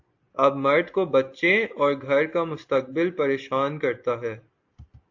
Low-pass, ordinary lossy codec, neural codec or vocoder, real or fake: 7.2 kHz; AAC, 48 kbps; none; real